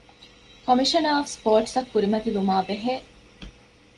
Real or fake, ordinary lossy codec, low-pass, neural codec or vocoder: real; Opus, 24 kbps; 10.8 kHz; none